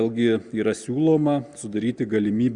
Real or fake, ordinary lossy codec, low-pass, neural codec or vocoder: real; Opus, 64 kbps; 9.9 kHz; none